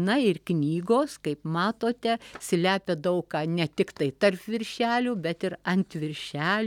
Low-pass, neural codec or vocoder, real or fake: 19.8 kHz; none; real